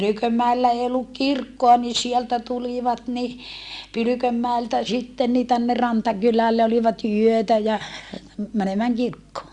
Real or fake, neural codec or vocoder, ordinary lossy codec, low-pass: real; none; Opus, 64 kbps; 10.8 kHz